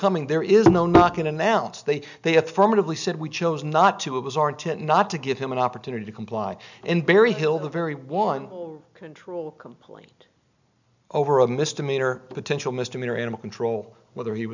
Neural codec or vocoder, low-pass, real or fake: none; 7.2 kHz; real